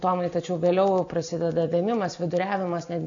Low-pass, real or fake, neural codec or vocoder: 7.2 kHz; real; none